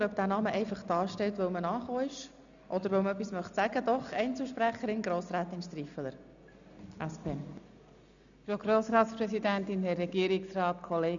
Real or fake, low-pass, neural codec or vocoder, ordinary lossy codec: real; 7.2 kHz; none; none